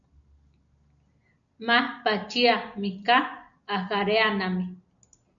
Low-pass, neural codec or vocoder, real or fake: 7.2 kHz; none; real